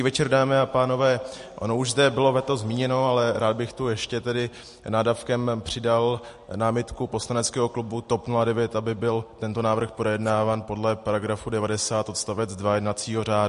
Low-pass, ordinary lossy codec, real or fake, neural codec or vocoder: 10.8 kHz; MP3, 48 kbps; real; none